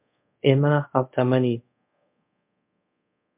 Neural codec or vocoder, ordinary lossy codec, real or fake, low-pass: codec, 24 kHz, 0.5 kbps, DualCodec; MP3, 32 kbps; fake; 3.6 kHz